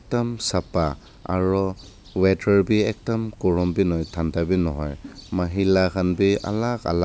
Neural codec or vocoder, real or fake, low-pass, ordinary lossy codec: none; real; none; none